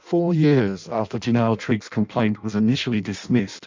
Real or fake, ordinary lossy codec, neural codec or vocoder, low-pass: fake; MP3, 64 kbps; codec, 16 kHz in and 24 kHz out, 0.6 kbps, FireRedTTS-2 codec; 7.2 kHz